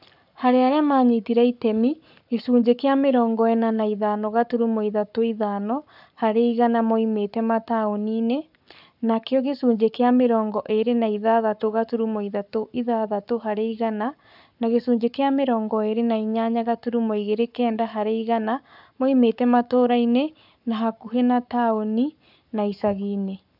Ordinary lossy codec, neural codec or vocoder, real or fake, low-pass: none; codec, 44.1 kHz, 7.8 kbps, Pupu-Codec; fake; 5.4 kHz